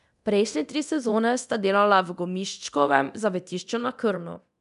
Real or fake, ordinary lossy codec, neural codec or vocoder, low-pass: fake; none; codec, 24 kHz, 0.9 kbps, DualCodec; 10.8 kHz